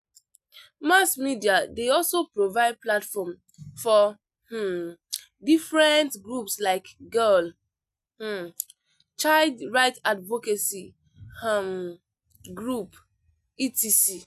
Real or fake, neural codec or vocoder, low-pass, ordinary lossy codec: real; none; 14.4 kHz; none